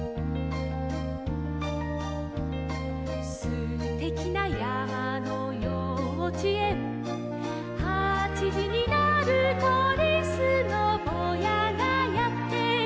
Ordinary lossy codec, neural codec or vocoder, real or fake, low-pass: none; none; real; none